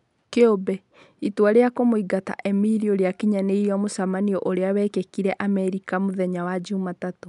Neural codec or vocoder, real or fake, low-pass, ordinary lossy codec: none; real; 10.8 kHz; none